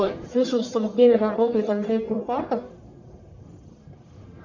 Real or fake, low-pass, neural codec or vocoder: fake; 7.2 kHz; codec, 44.1 kHz, 1.7 kbps, Pupu-Codec